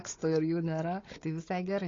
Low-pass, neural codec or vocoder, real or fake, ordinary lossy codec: 7.2 kHz; codec, 16 kHz, 16 kbps, FunCodec, trained on Chinese and English, 50 frames a second; fake; AAC, 32 kbps